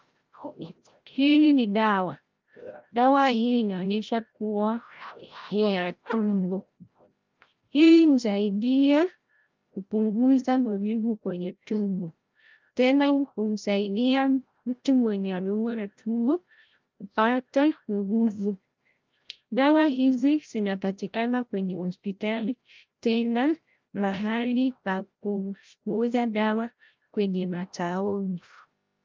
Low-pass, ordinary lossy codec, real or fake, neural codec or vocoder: 7.2 kHz; Opus, 24 kbps; fake; codec, 16 kHz, 0.5 kbps, FreqCodec, larger model